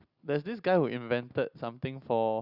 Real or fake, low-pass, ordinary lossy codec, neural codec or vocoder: real; 5.4 kHz; Opus, 64 kbps; none